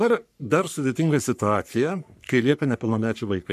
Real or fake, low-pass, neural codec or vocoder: fake; 14.4 kHz; codec, 44.1 kHz, 3.4 kbps, Pupu-Codec